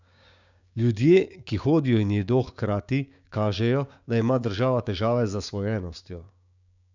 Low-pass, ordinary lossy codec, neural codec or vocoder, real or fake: 7.2 kHz; none; codec, 16 kHz, 6 kbps, DAC; fake